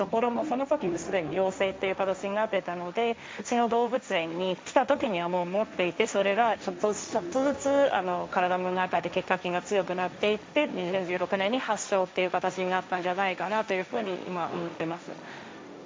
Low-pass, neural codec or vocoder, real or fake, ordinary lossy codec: none; codec, 16 kHz, 1.1 kbps, Voila-Tokenizer; fake; none